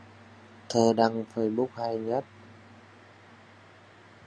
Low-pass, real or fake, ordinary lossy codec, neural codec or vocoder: 9.9 kHz; real; Opus, 64 kbps; none